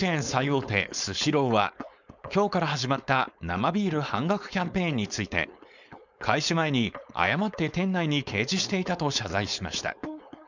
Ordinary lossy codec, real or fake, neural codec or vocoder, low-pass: none; fake; codec, 16 kHz, 4.8 kbps, FACodec; 7.2 kHz